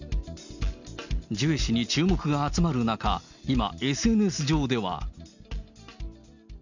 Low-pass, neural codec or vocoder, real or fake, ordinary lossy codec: 7.2 kHz; none; real; none